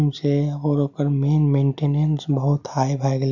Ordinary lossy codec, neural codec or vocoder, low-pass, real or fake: none; none; 7.2 kHz; real